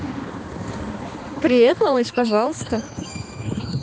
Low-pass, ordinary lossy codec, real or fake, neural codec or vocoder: none; none; fake; codec, 16 kHz, 2 kbps, X-Codec, HuBERT features, trained on balanced general audio